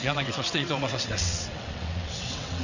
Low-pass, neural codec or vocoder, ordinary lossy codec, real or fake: 7.2 kHz; vocoder, 22.05 kHz, 80 mel bands, WaveNeXt; none; fake